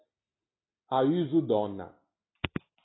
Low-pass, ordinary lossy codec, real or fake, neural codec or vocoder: 7.2 kHz; AAC, 16 kbps; real; none